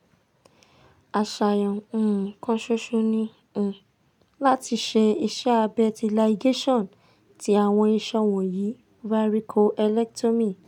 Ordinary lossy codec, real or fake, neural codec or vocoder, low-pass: none; real; none; 19.8 kHz